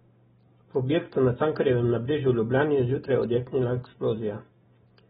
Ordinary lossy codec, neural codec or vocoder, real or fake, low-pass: AAC, 16 kbps; none; real; 19.8 kHz